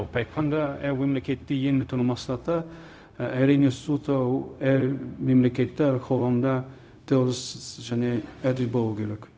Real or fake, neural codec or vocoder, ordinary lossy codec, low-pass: fake; codec, 16 kHz, 0.4 kbps, LongCat-Audio-Codec; none; none